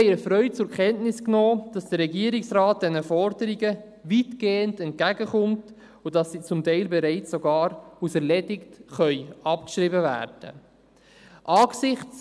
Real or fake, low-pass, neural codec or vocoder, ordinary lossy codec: real; none; none; none